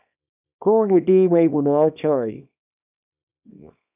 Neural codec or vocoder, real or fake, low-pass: codec, 24 kHz, 0.9 kbps, WavTokenizer, small release; fake; 3.6 kHz